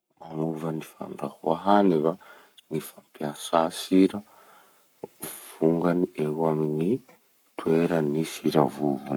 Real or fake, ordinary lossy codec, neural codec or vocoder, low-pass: fake; none; codec, 44.1 kHz, 7.8 kbps, Pupu-Codec; none